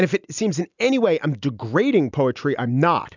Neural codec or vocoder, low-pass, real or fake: none; 7.2 kHz; real